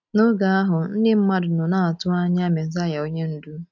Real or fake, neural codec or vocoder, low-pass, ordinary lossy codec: real; none; 7.2 kHz; none